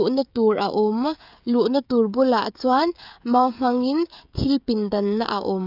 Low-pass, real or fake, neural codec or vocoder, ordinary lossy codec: 5.4 kHz; fake; codec, 16 kHz, 16 kbps, FreqCodec, smaller model; none